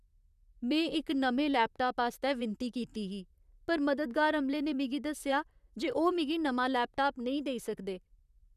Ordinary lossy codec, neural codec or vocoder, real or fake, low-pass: none; vocoder, 44.1 kHz, 128 mel bands, Pupu-Vocoder; fake; 14.4 kHz